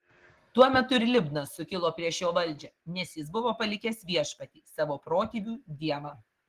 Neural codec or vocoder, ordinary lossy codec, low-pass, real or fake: none; Opus, 16 kbps; 14.4 kHz; real